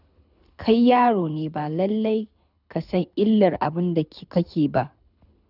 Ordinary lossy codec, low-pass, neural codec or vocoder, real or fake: none; 5.4 kHz; codec, 24 kHz, 6 kbps, HILCodec; fake